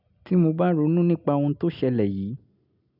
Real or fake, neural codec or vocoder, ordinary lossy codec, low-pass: real; none; none; 5.4 kHz